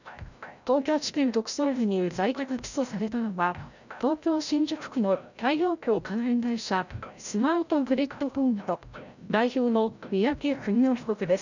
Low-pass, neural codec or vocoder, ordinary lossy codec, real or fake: 7.2 kHz; codec, 16 kHz, 0.5 kbps, FreqCodec, larger model; none; fake